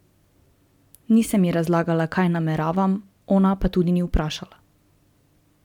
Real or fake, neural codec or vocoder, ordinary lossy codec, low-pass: real; none; MP3, 96 kbps; 19.8 kHz